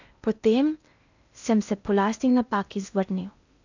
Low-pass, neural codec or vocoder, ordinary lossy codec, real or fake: 7.2 kHz; codec, 16 kHz in and 24 kHz out, 0.6 kbps, FocalCodec, streaming, 2048 codes; none; fake